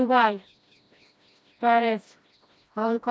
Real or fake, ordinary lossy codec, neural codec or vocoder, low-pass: fake; none; codec, 16 kHz, 1 kbps, FreqCodec, smaller model; none